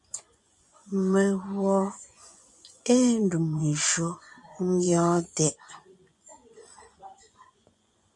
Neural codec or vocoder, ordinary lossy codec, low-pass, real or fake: vocoder, 24 kHz, 100 mel bands, Vocos; MP3, 64 kbps; 10.8 kHz; fake